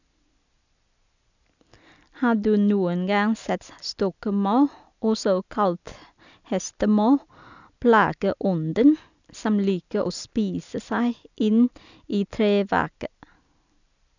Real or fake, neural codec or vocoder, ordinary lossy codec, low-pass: real; none; none; 7.2 kHz